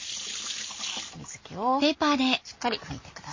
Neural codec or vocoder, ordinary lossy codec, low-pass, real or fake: none; MP3, 32 kbps; 7.2 kHz; real